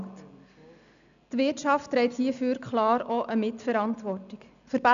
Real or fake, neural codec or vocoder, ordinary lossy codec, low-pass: real; none; none; 7.2 kHz